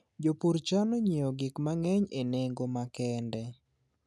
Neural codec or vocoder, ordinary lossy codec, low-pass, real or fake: none; none; none; real